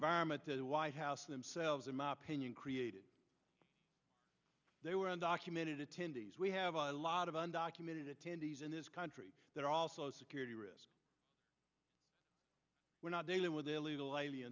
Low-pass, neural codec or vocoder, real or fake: 7.2 kHz; none; real